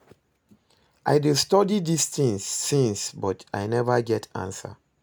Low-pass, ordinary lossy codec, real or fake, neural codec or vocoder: none; none; real; none